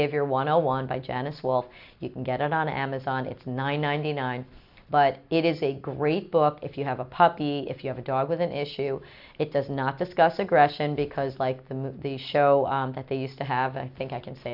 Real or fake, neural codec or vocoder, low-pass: real; none; 5.4 kHz